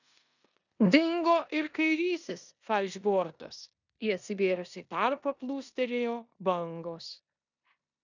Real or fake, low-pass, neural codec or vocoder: fake; 7.2 kHz; codec, 16 kHz in and 24 kHz out, 0.9 kbps, LongCat-Audio-Codec, four codebook decoder